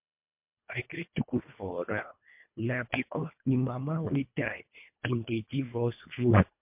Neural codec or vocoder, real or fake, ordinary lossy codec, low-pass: codec, 24 kHz, 1.5 kbps, HILCodec; fake; MP3, 32 kbps; 3.6 kHz